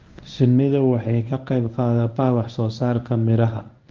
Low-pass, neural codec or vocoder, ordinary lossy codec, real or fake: 7.2 kHz; codec, 24 kHz, 0.9 kbps, WavTokenizer, medium speech release version 1; Opus, 24 kbps; fake